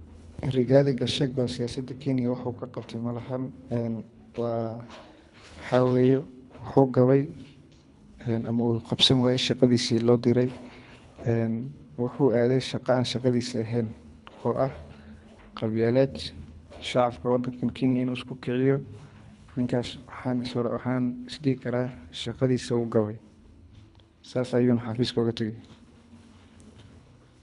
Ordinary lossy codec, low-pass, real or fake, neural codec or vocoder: none; 10.8 kHz; fake; codec, 24 kHz, 3 kbps, HILCodec